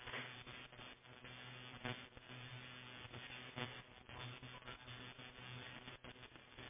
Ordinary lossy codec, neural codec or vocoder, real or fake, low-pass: MP3, 16 kbps; none; real; 3.6 kHz